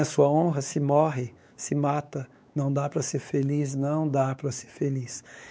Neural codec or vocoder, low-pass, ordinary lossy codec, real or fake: codec, 16 kHz, 4 kbps, X-Codec, WavLM features, trained on Multilingual LibriSpeech; none; none; fake